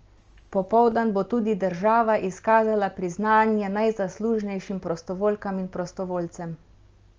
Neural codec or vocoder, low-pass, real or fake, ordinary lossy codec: none; 7.2 kHz; real; Opus, 24 kbps